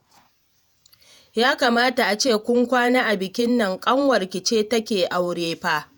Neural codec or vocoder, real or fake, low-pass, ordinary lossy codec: vocoder, 48 kHz, 128 mel bands, Vocos; fake; none; none